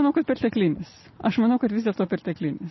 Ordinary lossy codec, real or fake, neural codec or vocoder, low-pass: MP3, 24 kbps; real; none; 7.2 kHz